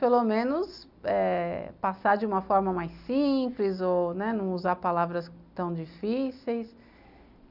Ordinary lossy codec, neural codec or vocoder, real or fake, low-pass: none; none; real; 5.4 kHz